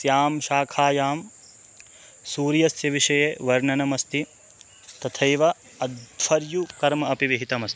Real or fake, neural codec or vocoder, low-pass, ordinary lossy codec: real; none; none; none